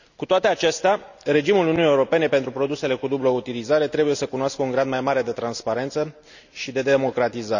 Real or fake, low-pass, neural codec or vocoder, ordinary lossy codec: real; 7.2 kHz; none; none